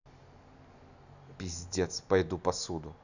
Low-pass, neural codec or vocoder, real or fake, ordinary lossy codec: 7.2 kHz; none; real; none